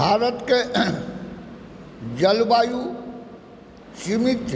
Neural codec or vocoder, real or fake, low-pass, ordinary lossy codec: none; real; none; none